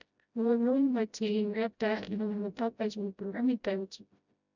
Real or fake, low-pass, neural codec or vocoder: fake; 7.2 kHz; codec, 16 kHz, 0.5 kbps, FreqCodec, smaller model